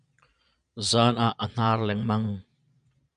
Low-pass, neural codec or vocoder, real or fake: 9.9 kHz; vocoder, 22.05 kHz, 80 mel bands, Vocos; fake